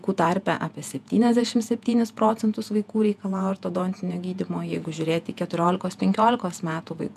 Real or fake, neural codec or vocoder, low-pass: fake; vocoder, 48 kHz, 128 mel bands, Vocos; 14.4 kHz